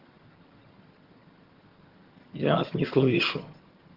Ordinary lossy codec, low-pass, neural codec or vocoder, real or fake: Opus, 16 kbps; 5.4 kHz; vocoder, 22.05 kHz, 80 mel bands, HiFi-GAN; fake